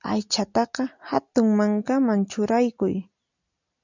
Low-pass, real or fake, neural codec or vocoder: 7.2 kHz; real; none